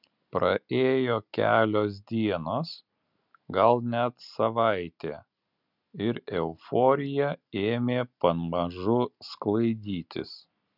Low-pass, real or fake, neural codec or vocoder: 5.4 kHz; real; none